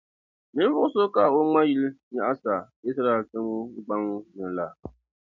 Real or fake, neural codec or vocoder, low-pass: real; none; 7.2 kHz